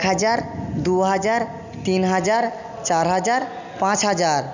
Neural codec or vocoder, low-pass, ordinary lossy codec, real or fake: none; 7.2 kHz; none; real